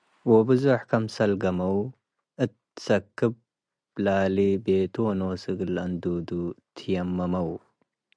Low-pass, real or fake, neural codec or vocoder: 9.9 kHz; real; none